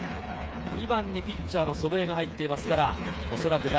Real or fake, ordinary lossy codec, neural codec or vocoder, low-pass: fake; none; codec, 16 kHz, 4 kbps, FreqCodec, smaller model; none